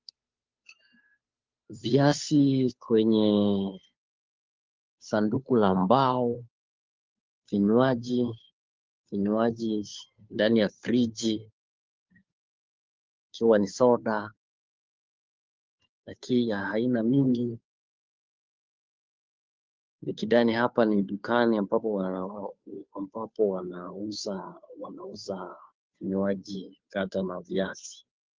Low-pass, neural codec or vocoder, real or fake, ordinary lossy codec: 7.2 kHz; codec, 16 kHz, 2 kbps, FunCodec, trained on Chinese and English, 25 frames a second; fake; Opus, 24 kbps